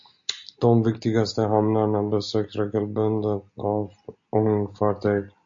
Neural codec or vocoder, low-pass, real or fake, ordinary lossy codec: none; 7.2 kHz; real; MP3, 48 kbps